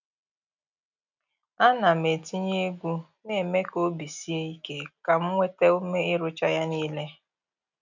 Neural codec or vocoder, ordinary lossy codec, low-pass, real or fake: none; none; 7.2 kHz; real